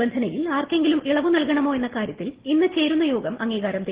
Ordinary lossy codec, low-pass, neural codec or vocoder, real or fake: Opus, 16 kbps; 3.6 kHz; none; real